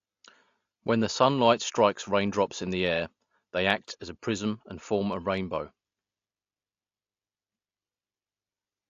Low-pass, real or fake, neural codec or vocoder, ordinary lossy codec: 7.2 kHz; real; none; AAC, 48 kbps